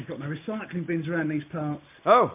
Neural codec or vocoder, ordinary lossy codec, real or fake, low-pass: none; MP3, 32 kbps; real; 3.6 kHz